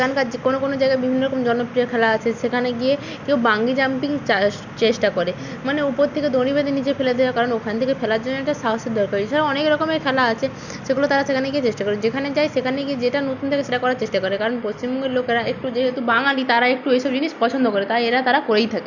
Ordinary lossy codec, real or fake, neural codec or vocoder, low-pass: none; real; none; 7.2 kHz